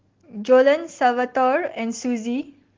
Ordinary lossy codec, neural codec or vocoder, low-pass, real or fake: Opus, 16 kbps; none; 7.2 kHz; real